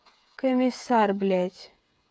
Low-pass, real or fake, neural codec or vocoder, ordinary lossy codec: none; fake; codec, 16 kHz, 8 kbps, FreqCodec, smaller model; none